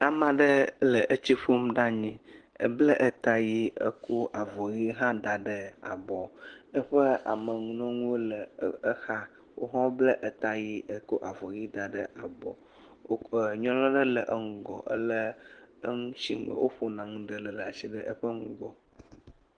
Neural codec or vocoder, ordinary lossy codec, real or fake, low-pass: none; Opus, 16 kbps; real; 9.9 kHz